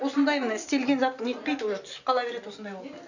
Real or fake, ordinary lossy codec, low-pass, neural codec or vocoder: real; none; 7.2 kHz; none